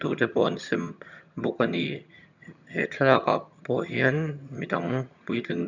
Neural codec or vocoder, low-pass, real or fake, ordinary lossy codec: vocoder, 22.05 kHz, 80 mel bands, HiFi-GAN; 7.2 kHz; fake; none